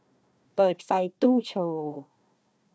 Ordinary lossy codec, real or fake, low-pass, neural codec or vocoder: none; fake; none; codec, 16 kHz, 1 kbps, FunCodec, trained on Chinese and English, 50 frames a second